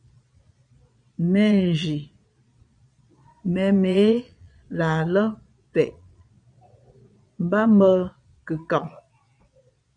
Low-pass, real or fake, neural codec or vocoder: 9.9 kHz; fake; vocoder, 22.05 kHz, 80 mel bands, Vocos